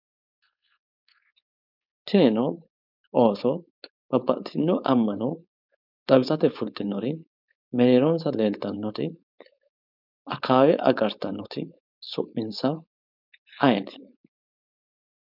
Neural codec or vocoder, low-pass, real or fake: codec, 16 kHz, 4.8 kbps, FACodec; 5.4 kHz; fake